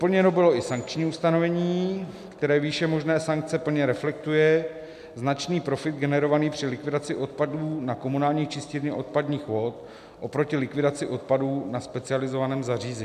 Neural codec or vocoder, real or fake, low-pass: none; real; 14.4 kHz